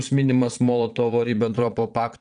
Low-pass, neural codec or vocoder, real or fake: 9.9 kHz; vocoder, 22.05 kHz, 80 mel bands, Vocos; fake